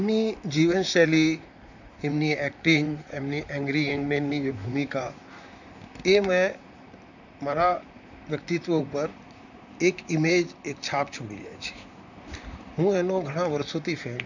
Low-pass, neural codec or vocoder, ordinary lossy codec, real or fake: 7.2 kHz; vocoder, 44.1 kHz, 128 mel bands, Pupu-Vocoder; none; fake